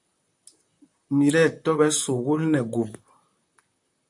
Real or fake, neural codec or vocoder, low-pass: fake; vocoder, 44.1 kHz, 128 mel bands, Pupu-Vocoder; 10.8 kHz